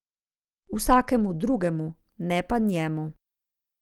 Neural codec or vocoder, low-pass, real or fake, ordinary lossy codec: none; 19.8 kHz; real; Opus, 24 kbps